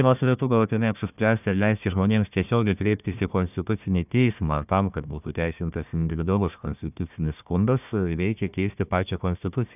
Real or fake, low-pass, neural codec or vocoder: fake; 3.6 kHz; codec, 16 kHz, 1 kbps, FunCodec, trained on Chinese and English, 50 frames a second